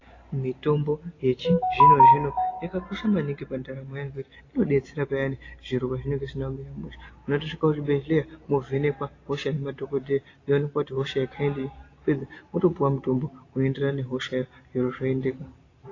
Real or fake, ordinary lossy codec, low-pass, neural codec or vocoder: real; AAC, 32 kbps; 7.2 kHz; none